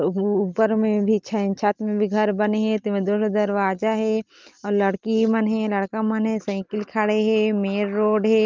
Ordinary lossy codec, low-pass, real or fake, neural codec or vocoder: Opus, 24 kbps; 7.2 kHz; real; none